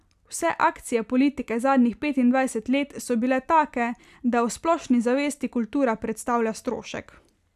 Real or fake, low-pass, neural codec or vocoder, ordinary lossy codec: real; 14.4 kHz; none; none